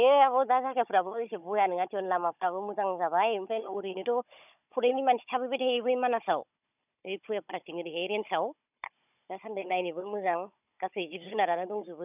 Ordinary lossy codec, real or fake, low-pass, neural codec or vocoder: none; fake; 3.6 kHz; codec, 16 kHz, 16 kbps, FunCodec, trained on Chinese and English, 50 frames a second